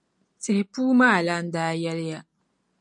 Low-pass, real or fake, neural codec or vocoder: 10.8 kHz; real; none